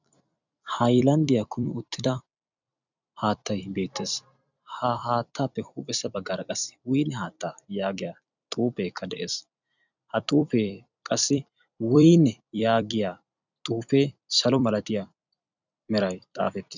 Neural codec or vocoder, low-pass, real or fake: vocoder, 44.1 kHz, 128 mel bands every 256 samples, BigVGAN v2; 7.2 kHz; fake